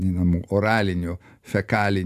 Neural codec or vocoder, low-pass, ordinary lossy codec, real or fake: none; 14.4 kHz; AAC, 96 kbps; real